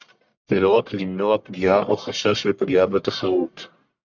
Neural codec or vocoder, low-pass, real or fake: codec, 44.1 kHz, 1.7 kbps, Pupu-Codec; 7.2 kHz; fake